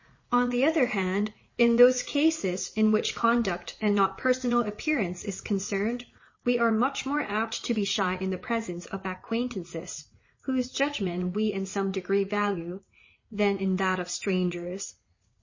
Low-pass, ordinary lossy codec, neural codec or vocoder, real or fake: 7.2 kHz; MP3, 32 kbps; vocoder, 44.1 kHz, 80 mel bands, Vocos; fake